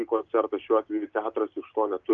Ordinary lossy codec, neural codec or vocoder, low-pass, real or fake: Opus, 24 kbps; none; 7.2 kHz; real